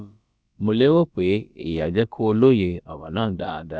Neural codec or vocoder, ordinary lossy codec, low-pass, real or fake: codec, 16 kHz, about 1 kbps, DyCAST, with the encoder's durations; none; none; fake